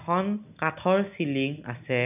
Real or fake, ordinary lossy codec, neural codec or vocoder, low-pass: real; none; none; 3.6 kHz